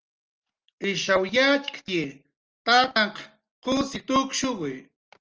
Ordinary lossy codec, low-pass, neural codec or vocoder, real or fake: Opus, 24 kbps; 7.2 kHz; none; real